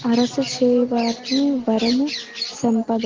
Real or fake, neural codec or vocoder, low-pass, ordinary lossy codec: real; none; 7.2 kHz; Opus, 16 kbps